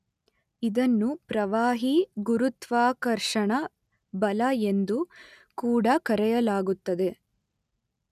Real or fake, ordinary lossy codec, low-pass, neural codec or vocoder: real; none; 14.4 kHz; none